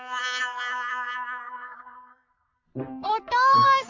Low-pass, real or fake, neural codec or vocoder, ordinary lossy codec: 7.2 kHz; fake; codec, 44.1 kHz, 3.4 kbps, Pupu-Codec; MP3, 48 kbps